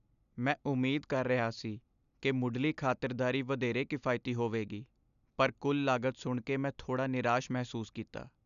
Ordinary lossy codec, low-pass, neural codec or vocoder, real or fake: none; 7.2 kHz; none; real